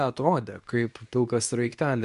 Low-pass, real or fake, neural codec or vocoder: 10.8 kHz; fake; codec, 24 kHz, 0.9 kbps, WavTokenizer, medium speech release version 2